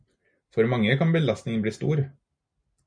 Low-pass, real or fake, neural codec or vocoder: 9.9 kHz; real; none